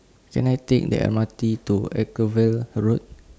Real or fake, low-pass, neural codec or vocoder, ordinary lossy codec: real; none; none; none